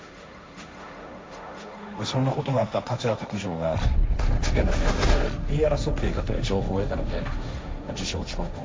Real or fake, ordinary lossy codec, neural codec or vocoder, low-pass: fake; none; codec, 16 kHz, 1.1 kbps, Voila-Tokenizer; none